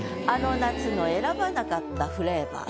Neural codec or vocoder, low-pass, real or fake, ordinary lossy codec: none; none; real; none